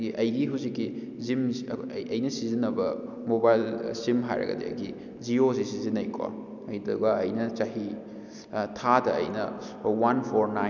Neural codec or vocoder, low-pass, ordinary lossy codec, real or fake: none; 7.2 kHz; none; real